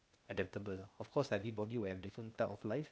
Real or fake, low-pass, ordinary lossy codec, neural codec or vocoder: fake; none; none; codec, 16 kHz, 0.8 kbps, ZipCodec